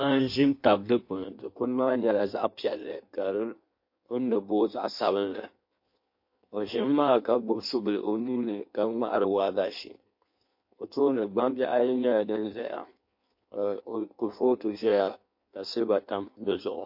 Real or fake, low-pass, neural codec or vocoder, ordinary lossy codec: fake; 5.4 kHz; codec, 16 kHz in and 24 kHz out, 1.1 kbps, FireRedTTS-2 codec; MP3, 32 kbps